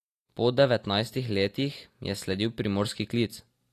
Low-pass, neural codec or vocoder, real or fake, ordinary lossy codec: 14.4 kHz; none; real; AAC, 48 kbps